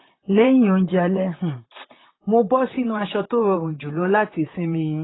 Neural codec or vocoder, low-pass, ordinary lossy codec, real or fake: vocoder, 44.1 kHz, 128 mel bands, Pupu-Vocoder; 7.2 kHz; AAC, 16 kbps; fake